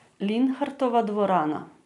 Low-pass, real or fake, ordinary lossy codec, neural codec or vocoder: 10.8 kHz; real; none; none